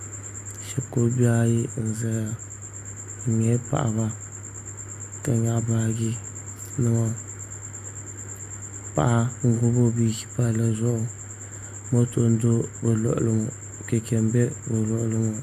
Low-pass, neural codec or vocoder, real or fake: 14.4 kHz; none; real